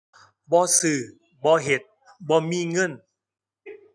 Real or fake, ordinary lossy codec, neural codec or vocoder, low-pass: real; none; none; none